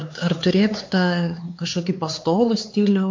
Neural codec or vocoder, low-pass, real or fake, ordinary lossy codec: codec, 16 kHz, 4 kbps, X-Codec, HuBERT features, trained on LibriSpeech; 7.2 kHz; fake; MP3, 48 kbps